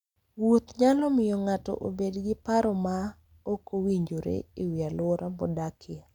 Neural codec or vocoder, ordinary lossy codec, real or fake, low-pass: none; none; real; 19.8 kHz